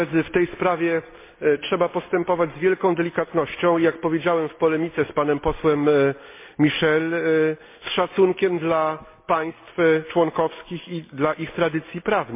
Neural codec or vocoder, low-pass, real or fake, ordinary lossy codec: none; 3.6 kHz; real; MP3, 24 kbps